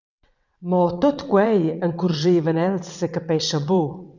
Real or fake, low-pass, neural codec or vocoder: fake; 7.2 kHz; vocoder, 22.05 kHz, 80 mel bands, WaveNeXt